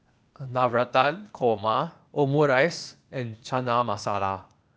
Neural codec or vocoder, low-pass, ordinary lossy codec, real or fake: codec, 16 kHz, 0.8 kbps, ZipCodec; none; none; fake